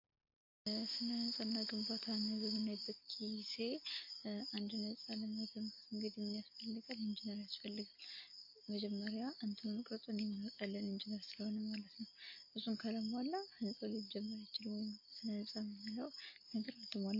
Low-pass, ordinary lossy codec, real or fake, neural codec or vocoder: 5.4 kHz; MP3, 32 kbps; real; none